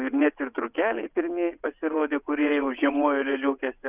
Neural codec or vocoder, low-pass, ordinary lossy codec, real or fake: vocoder, 22.05 kHz, 80 mel bands, WaveNeXt; 3.6 kHz; Opus, 64 kbps; fake